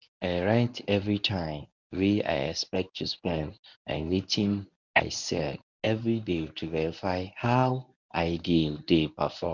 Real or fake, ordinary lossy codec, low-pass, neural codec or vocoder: fake; none; 7.2 kHz; codec, 24 kHz, 0.9 kbps, WavTokenizer, medium speech release version 1